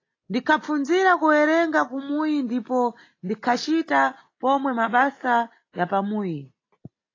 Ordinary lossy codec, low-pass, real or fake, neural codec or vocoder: AAC, 32 kbps; 7.2 kHz; real; none